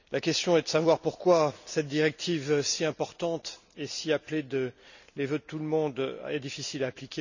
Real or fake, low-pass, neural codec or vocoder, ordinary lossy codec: real; 7.2 kHz; none; none